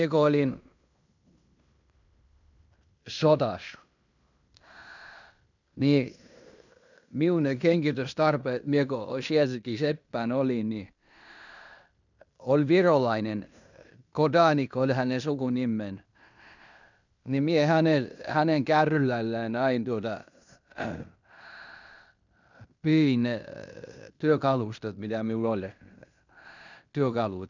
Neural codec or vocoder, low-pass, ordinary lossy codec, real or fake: codec, 16 kHz in and 24 kHz out, 0.9 kbps, LongCat-Audio-Codec, fine tuned four codebook decoder; 7.2 kHz; none; fake